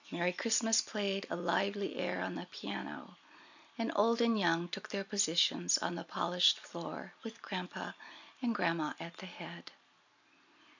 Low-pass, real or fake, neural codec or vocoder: 7.2 kHz; real; none